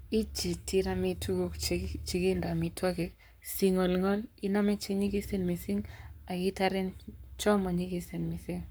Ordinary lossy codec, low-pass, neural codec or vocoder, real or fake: none; none; codec, 44.1 kHz, 7.8 kbps, Pupu-Codec; fake